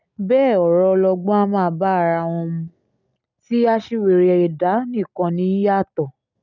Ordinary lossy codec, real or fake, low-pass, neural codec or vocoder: none; real; 7.2 kHz; none